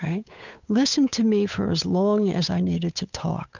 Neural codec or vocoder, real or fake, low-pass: codec, 16 kHz, 8 kbps, FunCodec, trained on Chinese and English, 25 frames a second; fake; 7.2 kHz